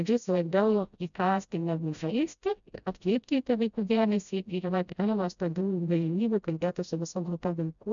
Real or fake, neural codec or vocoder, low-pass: fake; codec, 16 kHz, 0.5 kbps, FreqCodec, smaller model; 7.2 kHz